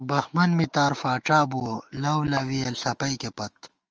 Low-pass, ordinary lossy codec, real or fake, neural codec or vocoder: 7.2 kHz; Opus, 24 kbps; fake; autoencoder, 48 kHz, 128 numbers a frame, DAC-VAE, trained on Japanese speech